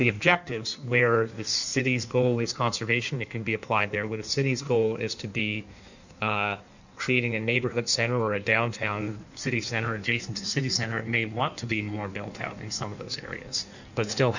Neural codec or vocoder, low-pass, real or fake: codec, 16 kHz in and 24 kHz out, 1.1 kbps, FireRedTTS-2 codec; 7.2 kHz; fake